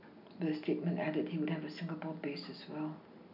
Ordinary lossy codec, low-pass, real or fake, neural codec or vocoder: none; 5.4 kHz; real; none